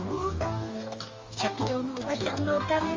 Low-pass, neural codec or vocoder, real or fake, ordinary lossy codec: 7.2 kHz; codec, 44.1 kHz, 2.6 kbps, DAC; fake; Opus, 32 kbps